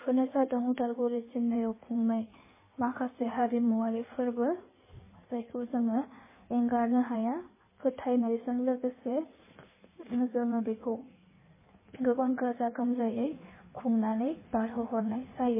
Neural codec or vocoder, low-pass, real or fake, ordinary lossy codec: codec, 16 kHz in and 24 kHz out, 1.1 kbps, FireRedTTS-2 codec; 3.6 kHz; fake; MP3, 16 kbps